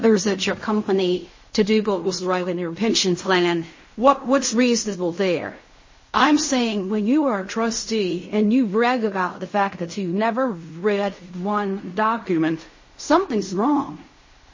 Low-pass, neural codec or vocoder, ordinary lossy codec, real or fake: 7.2 kHz; codec, 16 kHz in and 24 kHz out, 0.4 kbps, LongCat-Audio-Codec, fine tuned four codebook decoder; MP3, 32 kbps; fake